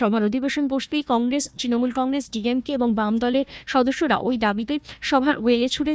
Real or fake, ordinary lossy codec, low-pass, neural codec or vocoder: fake; none; none; codec, 16 kHz, 1 kbps, FunCodec, trained on Chinese and English, 50 frames a second